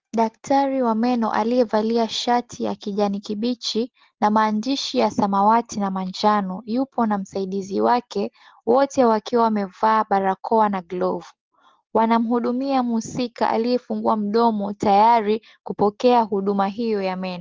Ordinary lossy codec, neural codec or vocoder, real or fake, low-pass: Opus, 16 kbps; none; real; 7.2 kHz